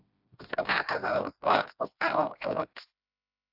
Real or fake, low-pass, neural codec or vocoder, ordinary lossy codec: fake; 5.4 kHz; codec, 16 kHz, 1 kbps, FreqCodec, smaller model; AAC, 48 kbps